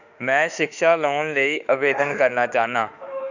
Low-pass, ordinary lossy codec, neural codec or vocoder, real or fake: 7.2 kHz; none; autoencoder, 48 kHz, 32 numbers a frame, DAC-VAE, trained on Japanese speech; fake